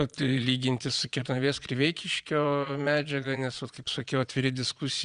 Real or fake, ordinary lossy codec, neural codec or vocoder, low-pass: fake; Opus, 64 kbps; vocoder, 22.05 kHz, 80 mel bands, Vocos; 9.9 kHz